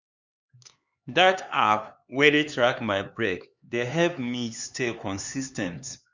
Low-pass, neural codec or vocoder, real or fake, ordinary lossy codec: 7.2 kHz; codec, 16 kHz, 4 kbps, X-Codec, HuBERT features, trained on LibriSpeech; fake; Opus, 64 kbps